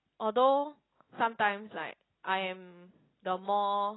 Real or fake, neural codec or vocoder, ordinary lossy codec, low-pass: real; none; AAC, 16 kbps; 7.2 kHz